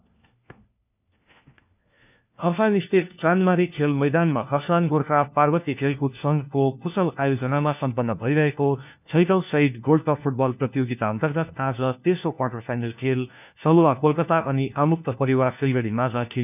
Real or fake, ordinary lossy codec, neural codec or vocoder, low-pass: fake; none; codec, 16 kHz, 1 kbps, FunCodec, trained on LibriTTS, 50 frames a second; 3.6 kHz